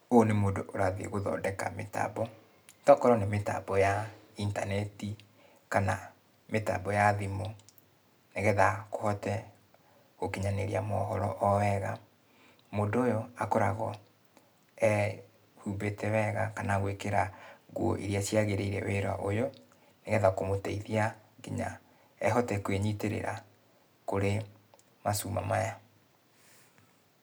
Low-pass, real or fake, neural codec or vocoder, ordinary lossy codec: none; real; none; none